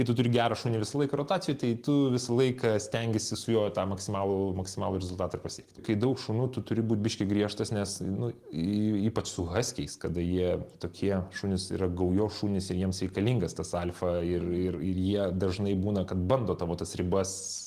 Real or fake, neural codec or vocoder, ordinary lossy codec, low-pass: real; none; Opus, 24 kbps; 14.4 kHz